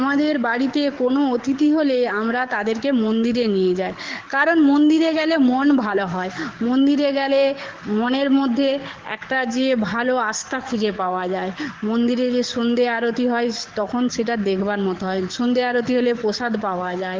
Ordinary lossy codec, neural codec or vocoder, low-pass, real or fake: Opus, 16 kbps; codec, 44.1 kHz, 7.8 kbps, DAC; 7.2 kHz; fake